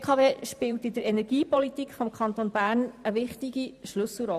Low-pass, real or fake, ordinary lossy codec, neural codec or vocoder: 14.4 kHz; fake; none; vocoder, 44.1 kHz, 128 mel bands every 512 samples, BigVGAN v2